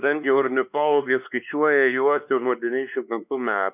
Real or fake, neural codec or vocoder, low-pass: fake; codec, 16 kHz, 2 kbps, X-Codec, WavLM features, trained on Multilingual LibriSpeech; 3.6 kHz